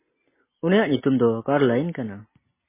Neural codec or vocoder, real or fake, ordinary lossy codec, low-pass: none; real; MP3, 16 kbps; 3.6 kHz